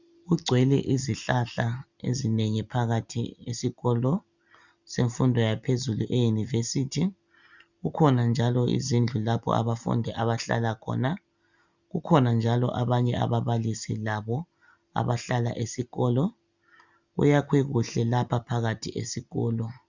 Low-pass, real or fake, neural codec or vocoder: 7.2 kHz; real; none